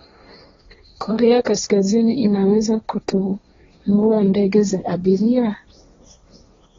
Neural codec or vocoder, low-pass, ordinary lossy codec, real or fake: codec, 16 kHz, 1.1 kbps, Voila-Tokenizer; 7.2 kHz; AAC, 24 kbps; fake